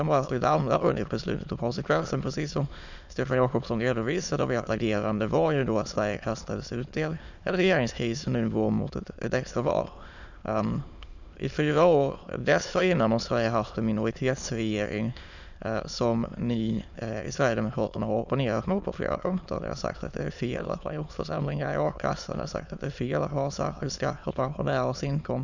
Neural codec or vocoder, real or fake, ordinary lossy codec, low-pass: autoencoder, 22.05 kHz, a latent of 192 numbers a frame, VITS, trained on many speakers; fake; none; 7.2 kHz